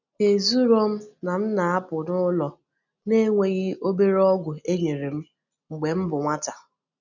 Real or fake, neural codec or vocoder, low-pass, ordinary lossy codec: real; none; 7.2 kHz; none